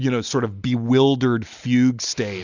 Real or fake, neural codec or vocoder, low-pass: real; none; 7.2 kHz